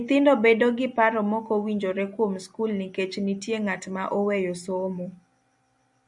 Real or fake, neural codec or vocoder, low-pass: real; none; 10.8 kHz